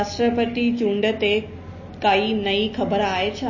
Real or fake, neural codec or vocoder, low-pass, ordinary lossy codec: real; none; 7.2 kHz; MP3, 32 kbps